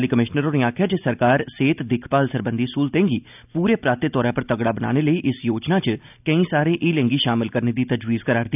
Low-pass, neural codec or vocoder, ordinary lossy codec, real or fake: 3.6 kHz; none; none; real